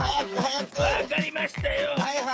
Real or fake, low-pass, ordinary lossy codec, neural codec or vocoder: fake; none; none; codec, 16 kHz, 8 kbps, FreqCodec, smaller model